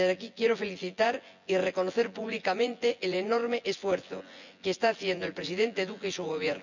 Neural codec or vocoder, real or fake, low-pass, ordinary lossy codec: vocoder, 24 kHz, 100 mel bands, Vocos; fake; 7.2 kHz; none